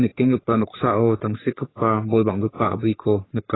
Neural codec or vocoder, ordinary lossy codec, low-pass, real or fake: codec, 44.1 kHz, 3.4 kbps, Pupu-Codec; AAC, 16 kbps; 7.2 kHz; fake